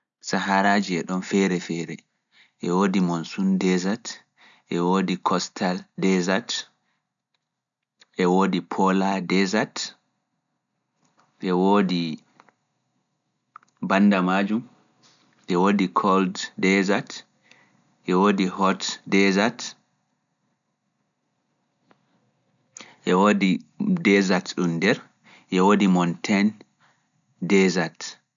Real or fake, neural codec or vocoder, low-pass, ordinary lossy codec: real; none; 7.2 kHz; none